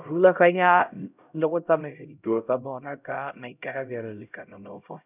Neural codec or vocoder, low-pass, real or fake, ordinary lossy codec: codec, 16 kHz, 0.5 kbps, X-Codec, HuBERT features, trained on LibriSpeech; 3.6 kHz; fake; none